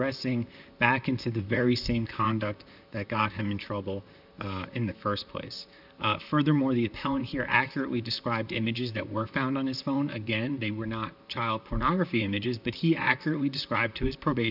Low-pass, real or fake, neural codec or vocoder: 5.4 kHz; fake; vocoder, 44.1 kHz, 128 mel bands, Pupu-Vocoder